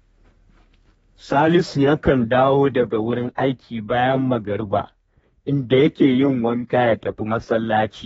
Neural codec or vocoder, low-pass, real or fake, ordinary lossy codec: codec, 32 kHz, 1.9 kbps, SNAC; 14.4 kHz; fake; AAC, 24 kbps